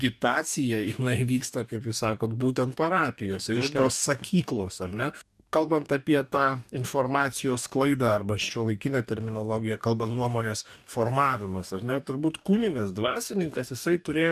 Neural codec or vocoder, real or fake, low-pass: codec, 44.1 kHz, 2.6 kbps, DAC; fake; 14.4 kHz